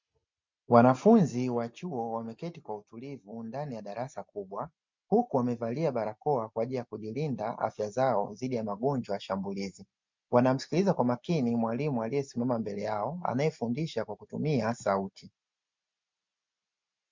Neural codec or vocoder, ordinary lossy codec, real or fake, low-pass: none; MP3, 64 kbps; real; 7.2 kHz